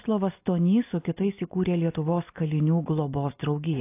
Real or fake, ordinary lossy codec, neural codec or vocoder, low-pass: real; AAC, 24 kbps; none; 3.6 kHz